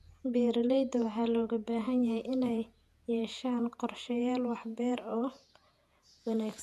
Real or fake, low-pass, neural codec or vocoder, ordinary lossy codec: fake; 14.4 kHz; vocoder, 48 kHz, 128 mel bands, Vocos; none